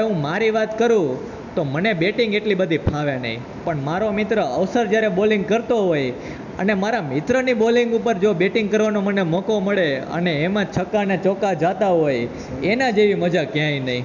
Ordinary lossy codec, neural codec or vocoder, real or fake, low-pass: none; none; real; 7.2 kHz